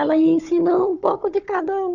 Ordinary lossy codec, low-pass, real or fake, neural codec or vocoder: none; 7.2 kHz; fake; codec, 16 kHz, 4 kbps, FunCodec, trained on Chinese and English, 50 frames a second